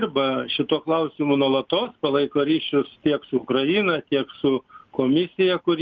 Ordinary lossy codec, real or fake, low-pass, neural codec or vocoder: Opus, 24 kbps; real; 7.2 kHz; none